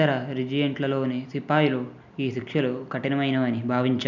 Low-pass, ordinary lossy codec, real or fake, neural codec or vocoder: 7.2 kHz; none; real; none